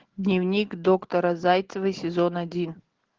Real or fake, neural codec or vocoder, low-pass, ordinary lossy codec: real; none; 7.2 kHz; Opus, 16 kbps